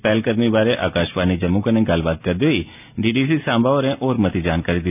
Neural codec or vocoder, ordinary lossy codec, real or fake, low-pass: none; none; real; 3.6 kHz